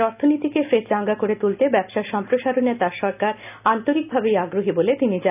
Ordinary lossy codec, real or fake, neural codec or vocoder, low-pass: none; real; none; 3.6 kHz